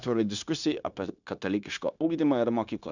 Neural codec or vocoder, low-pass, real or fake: codec, 16 kHz, 0.9 kbps, LongCat-Audio-Codec; 7.2 kHz; fake